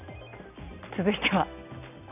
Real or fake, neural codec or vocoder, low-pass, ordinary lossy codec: real; none; 3.6 kHz; none